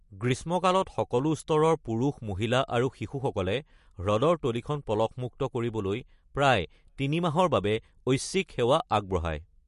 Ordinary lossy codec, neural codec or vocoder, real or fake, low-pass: MP3, 48 kbps; none; real; 14.4 kHz